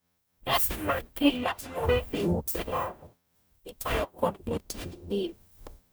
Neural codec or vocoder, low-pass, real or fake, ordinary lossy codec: codec, 44.1 kHz, 0.9 kbps, DAC; none; fake; none